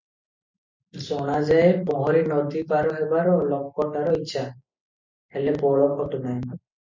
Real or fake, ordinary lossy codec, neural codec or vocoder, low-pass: real; MP3, 64 kbps; none; 7.2 kHz